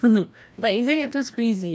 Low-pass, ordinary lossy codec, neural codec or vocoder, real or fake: none; none; codec, 16 kHz, 1 kbps, FreqCodec, larger model; fake